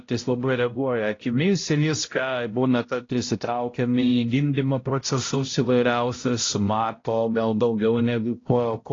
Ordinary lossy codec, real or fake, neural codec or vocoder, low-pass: AAC, 32 kbps; fake; codec, 16 kHz, 0.5 kbps, X-Codec, HuBERT features, trained on balanced general audio; 7.2 kHz